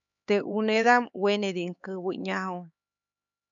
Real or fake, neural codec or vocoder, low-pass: fake; codec, 16 kHz, 4 kbps, X-Codec, HuBERT features, trained on LibriSpeech; 7.2 kHz